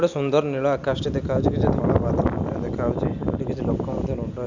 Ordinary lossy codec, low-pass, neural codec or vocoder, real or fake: none; 7.2 kHz; none; real